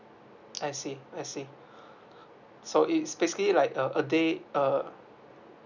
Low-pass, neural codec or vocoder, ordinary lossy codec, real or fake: 7.2 kHz; none; none; real